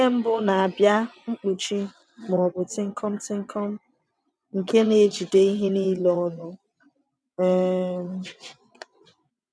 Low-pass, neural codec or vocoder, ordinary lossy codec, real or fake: none; vocoder, 22.05 kHz, 80 mel bands, WaveNeXt; none; fake